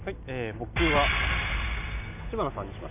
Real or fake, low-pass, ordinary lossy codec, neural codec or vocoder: real; 3.6 kHz; none; none